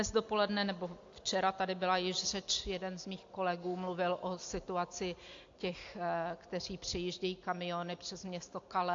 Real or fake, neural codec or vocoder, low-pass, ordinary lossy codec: real; none; 7.2 kHz; AAC, 48 kbps